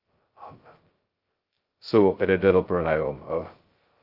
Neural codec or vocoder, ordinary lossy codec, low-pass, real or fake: codec, 16 kHz, 0.2 kbps, FocalCodec; Opus, 32 kbps; 5.4 kHz; fake